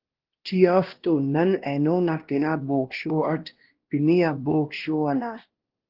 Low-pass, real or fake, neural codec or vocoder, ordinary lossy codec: 5.4 kHz; fake; codec, 16 kHz, 1 kbps, X-Codec, WavLM features, trained on Multilingual LibriSpeech; Opus, 16 kbps